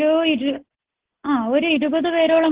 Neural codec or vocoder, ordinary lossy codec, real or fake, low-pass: none; Opus, 16 kbps; real; 3.6 kHz